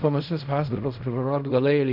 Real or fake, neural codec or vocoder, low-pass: fake; codec, 16 kHz in and 24 kHz out, 0.4 kbps, LongCat-Audio-Codec, fine tuned four codebook decoder; 5.4 kHz